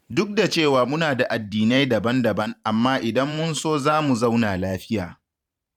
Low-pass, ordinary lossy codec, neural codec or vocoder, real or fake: 19.8 kHz; none; vocoder, 44.1 kHz, 128 mel bands every 512 samples, BigVGAN v2; fake